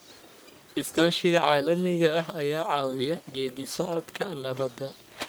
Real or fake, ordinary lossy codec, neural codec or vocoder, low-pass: fake; none; codec, 44.1 kHz, 1.7 kbps, Pupu-Codec; none